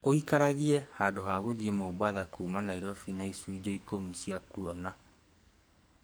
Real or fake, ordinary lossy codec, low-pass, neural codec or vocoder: fake; none; none; codec, 44.1 kHz, 2.6 kbps, SNAC